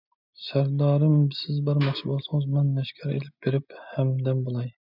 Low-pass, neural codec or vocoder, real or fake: 5.4 kHz; none; real